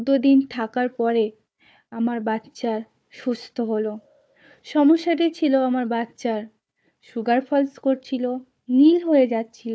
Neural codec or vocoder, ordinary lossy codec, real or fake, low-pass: codec, 16 kHz, 4 kbps, FunCodec, trained on Chinese and English, 50 frames a second; none; fake; none